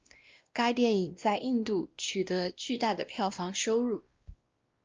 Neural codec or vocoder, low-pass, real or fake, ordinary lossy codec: codec, 16 kHz, 1 kbps, X-Codec, WavLM features, trained on Multilingual LibriSpeech; 7.2 kHz; fake; Opus, 24 kbps